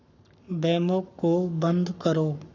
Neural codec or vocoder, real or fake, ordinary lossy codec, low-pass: codec, 44.1 kHz, 7.8 kbps, Pupu-Codec; fake; none; 7.2 kHz